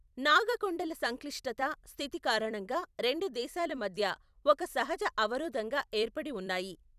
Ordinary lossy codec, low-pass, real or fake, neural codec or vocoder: none; 14.4 kHz; fake; vocoder, 44.1 kHz, 128 mel bands every 256 samples, BigVGAN v2